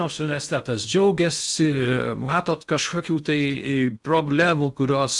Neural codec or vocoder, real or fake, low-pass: codec, 16 kHz in and 24 kHz out, 0.6 kbps, FocalCodec, streaming, 2048 codes; fake; 10.8 kHz